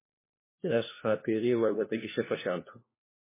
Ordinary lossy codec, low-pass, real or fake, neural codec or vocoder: MP3, 16 kbps; 3.6 kHz; fake; codec, 16 kHz, 1 kbps, FunCodec, trained on LibriTTS, 50 frames a second